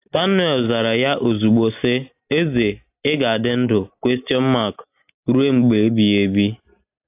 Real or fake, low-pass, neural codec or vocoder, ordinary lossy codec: real; 3.6 kHz; none; AAC, 32 kbps